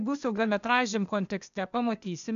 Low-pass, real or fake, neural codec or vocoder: 7.2 kHz; fake; codec, 16 kHz, 0.8 kbps, ZipCodec